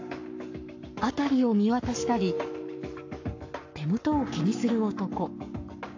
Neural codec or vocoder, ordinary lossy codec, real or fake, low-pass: codec, 44.1 kHz, 7.8 kbps, Pupu-Codec; AAC, 48 kbps; fake; 7.2 kHz